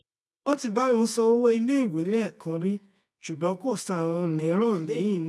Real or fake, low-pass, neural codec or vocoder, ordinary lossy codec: fake; none; codec, 24 kHz, 0.9 kbps, WavTokenizer, medium music audio release; none